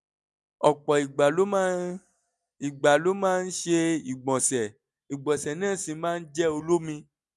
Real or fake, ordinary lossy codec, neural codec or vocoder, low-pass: real; none; none; none